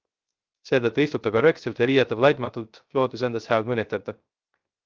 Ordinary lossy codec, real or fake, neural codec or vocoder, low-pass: Opus, 32 kbps; fake; codec, 16 kHz, 0.3 kbps, FocalCodec; 7.2 kHz